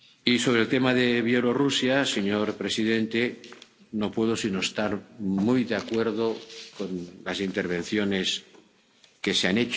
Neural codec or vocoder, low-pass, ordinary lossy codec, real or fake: none; none; none; real